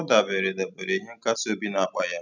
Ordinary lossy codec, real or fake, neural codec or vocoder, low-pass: none; real; none; 7.2 kHz